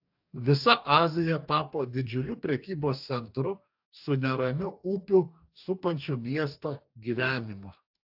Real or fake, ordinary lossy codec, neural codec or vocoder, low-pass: fake; AAC, 48 kbps; codec, 44.1 kHz, 2.6 kbps, DAC; 5.4 kHz